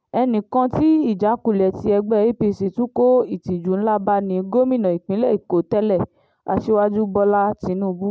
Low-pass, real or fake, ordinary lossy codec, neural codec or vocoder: none; real; none; none